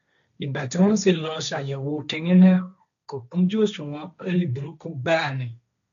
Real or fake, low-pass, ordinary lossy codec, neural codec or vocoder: fake; 7.2 kHz; none; codec, 16 kHz, 1.1 kbps, Voila-Tokenizer